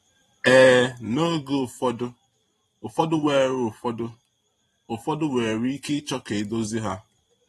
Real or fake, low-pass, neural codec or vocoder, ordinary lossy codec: fake; 19.8 kHz; vocoder, 44.1 kHz, 128 mel bands every 512 samples, BigVGAN v2; AAC, 32 kbps